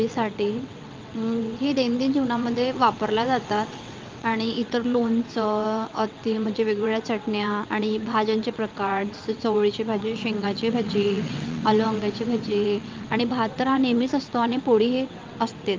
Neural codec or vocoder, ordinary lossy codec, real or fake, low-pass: vocoder, 22.05 kHz, 80 mel bands, WaveNeXt; Opus, 24 kbps; fake; 7.2 kHz